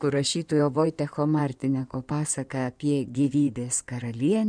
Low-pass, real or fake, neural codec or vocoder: 9.9 kHz; fake; codec, 16 kHz in and 24 kHz out, 2.2 kbps, FireRedTTS-2 codec